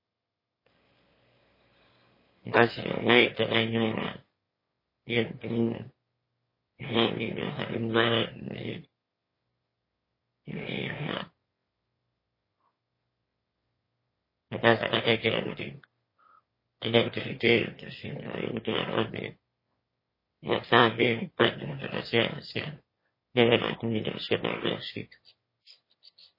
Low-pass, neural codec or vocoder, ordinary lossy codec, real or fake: 5.4 kHz; autoencoder, 22.05 kHz, a latent of 192 numbers a frame, VITS, trained on one speaker; MP3, 24 kbps; fake